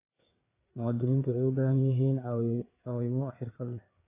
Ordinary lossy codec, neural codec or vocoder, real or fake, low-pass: AAC, 24 kbps; codec, 16 kHz, 4 kbps, FreqCodec, larger model; fake; 3.6 kHz